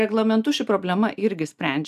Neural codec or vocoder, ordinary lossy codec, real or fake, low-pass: none; AAC, 96 kbps; real; 14.4 kHz